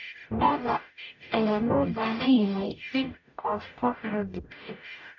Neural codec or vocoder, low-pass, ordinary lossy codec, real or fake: codec, 44.1 kHz, 0.9 kbps, DAC; 7.2 kHz; none; fake